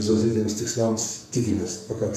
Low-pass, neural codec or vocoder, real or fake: 14.4 kHz; codec, 44.1 kHz, 2.6 kbps, SNAC; fake